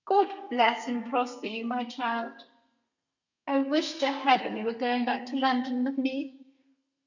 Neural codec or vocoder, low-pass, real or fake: codec, 32 kHz, 1.9 kbps, SNAC; 7.2 kHz; fake